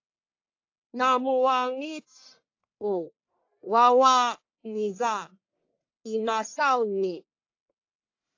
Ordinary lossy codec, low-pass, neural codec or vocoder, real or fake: AAC, 48 kbps; 7.2 kHz; codec, 44.1 kHz, 1.7 kbps, Pupu-Codec; fake